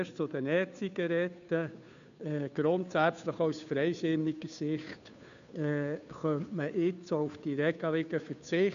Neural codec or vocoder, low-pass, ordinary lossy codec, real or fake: codec, 16 kHz, 2 kbps, FunCodec, trained on Chinese and English, 25 frames a second; 7.2 kHz; none; fake